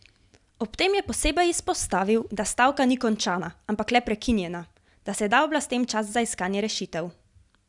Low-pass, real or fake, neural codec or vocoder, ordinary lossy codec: 10.8 kHz; real; none; none